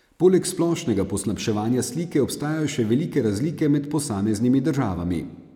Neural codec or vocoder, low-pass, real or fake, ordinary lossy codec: none; 19.8 kHz; real; none